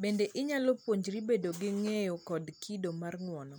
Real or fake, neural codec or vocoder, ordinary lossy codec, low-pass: real; none; none; none